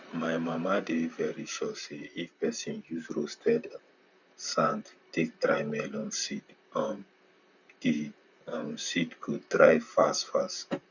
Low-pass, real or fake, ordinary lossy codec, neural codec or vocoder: 7.2 kHz; fake; none; vocoder, 44.1 kHz, 128 mel bands, Pupu-Vocoder